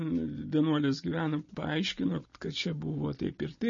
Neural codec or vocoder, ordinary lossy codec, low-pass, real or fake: none; MP3, 32 kbps; 7.2 kHz; real